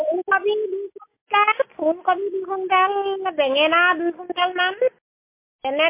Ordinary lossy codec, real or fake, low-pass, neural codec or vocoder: MP3, 24 kbps; real; 3.6 kHz; none